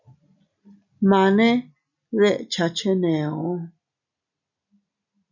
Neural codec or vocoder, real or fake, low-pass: none; real; 7.2 kHz